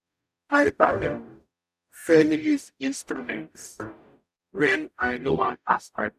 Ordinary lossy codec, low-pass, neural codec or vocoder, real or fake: none; 14.4 kHz; codec, 44.1 kHz, 0.9 kbps, DAC; fake